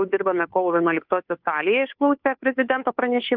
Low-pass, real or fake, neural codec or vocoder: 5.4 kHz; fake; codec, 16 kHz, 2 kbps, FunCodec, trained on Chinese and English, 25 frames a second